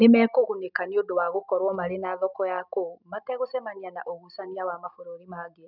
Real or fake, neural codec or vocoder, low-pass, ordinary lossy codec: real; none; 5.4 kHz; none